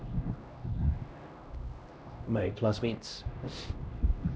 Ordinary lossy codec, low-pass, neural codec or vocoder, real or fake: none; none; codec, 16 kHz, 1 kbps, X-Codec, HuBERT features, trained on LibriSpeech; fake